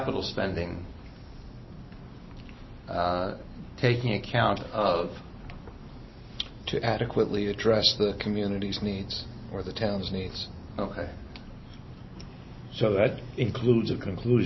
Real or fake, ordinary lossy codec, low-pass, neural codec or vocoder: real; MP3, 24 kbps; 7.2 kHz; none